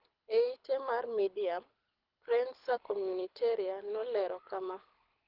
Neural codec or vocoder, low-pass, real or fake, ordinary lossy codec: codec, 24 kHz, 6 kbps, HILCodec; 5.4 kHz; fake; Opus, 32 kbps